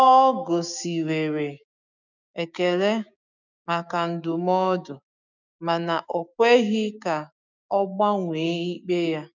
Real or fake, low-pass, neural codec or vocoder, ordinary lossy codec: fake; 7.2 kHz; codec, 16 kHz, 6 kbps, DAC; none